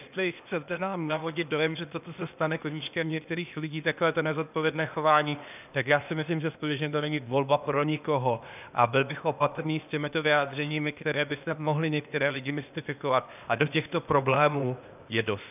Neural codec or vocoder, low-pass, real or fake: codec, 16 kHz, 0.8 kbps, ZipCodec; 3.6 kHz; fake